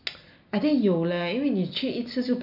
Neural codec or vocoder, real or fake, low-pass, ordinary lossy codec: none; real; 5.4 kHz; none